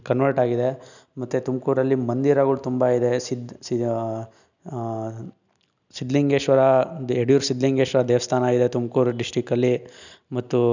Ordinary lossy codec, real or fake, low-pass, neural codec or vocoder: none; real; 7.2 kHz; none